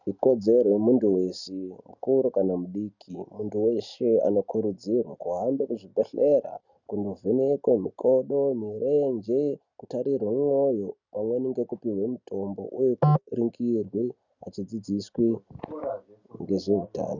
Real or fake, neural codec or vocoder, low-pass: real; none; 7.2 kHz